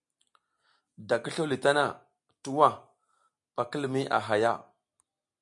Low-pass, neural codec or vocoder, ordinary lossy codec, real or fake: 10.8 kHz; none; MP3, 64 kbps; real